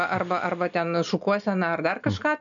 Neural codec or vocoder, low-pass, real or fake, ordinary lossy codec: none; 7.2 kHz; real; MP3, 96 kbps